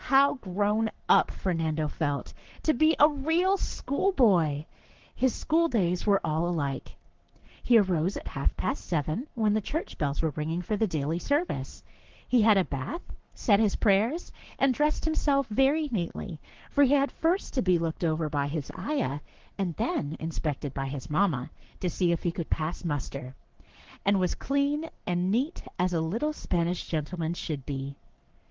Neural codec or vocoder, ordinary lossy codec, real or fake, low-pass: codec, 44.1 kHz, 7.8 kbps, Pupu-Codec; Opus, 16 kbps; fake; 7.2 kHz